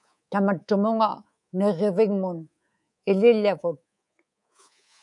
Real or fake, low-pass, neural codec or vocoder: fake; 10.8 kHz; codec, 24 kHz, 3.1 kbps, DualCodec